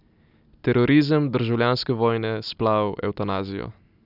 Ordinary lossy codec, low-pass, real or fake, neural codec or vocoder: none; 5.4 kHz; real; none